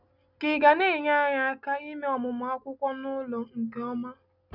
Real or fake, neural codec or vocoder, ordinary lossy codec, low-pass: real; none; none; 5.4 kHz